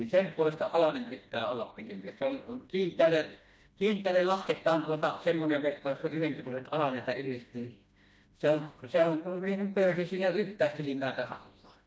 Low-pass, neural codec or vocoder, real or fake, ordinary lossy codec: none; codec, 16 kHz, 1 kbps, FreqCodec, smaller model; fake; none